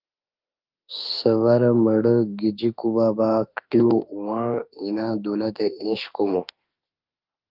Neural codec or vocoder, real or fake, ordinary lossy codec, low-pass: autoencoder, 48 kHz, 32 numbers a frame, DAC-VAE, trained on Japanese speech; fake; Opus, 24 kbps; 5.4 kHz